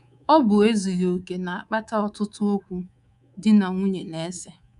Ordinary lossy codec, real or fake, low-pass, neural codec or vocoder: none; fake; 10.8 kHz; codec, 24 kHz, 3.1 kbps, DualCodec